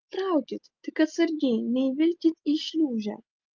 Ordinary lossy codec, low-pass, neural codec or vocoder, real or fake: Opus, 24 kbps; 7.2 kHz; none; real